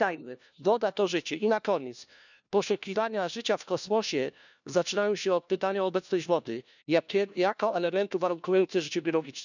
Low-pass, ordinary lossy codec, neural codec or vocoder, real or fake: 7.2 kHz; none; codec, 16 kHz, 1 kbps, FunCodec, trained on LibriTTS, 50 frames a second; fake